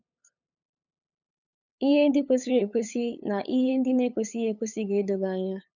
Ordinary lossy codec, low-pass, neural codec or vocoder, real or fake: none; 7.2 kHz; codec, 16 kHz, 8 kbps, FunCodec, trained on LibriTTS, 25 frames a second; fake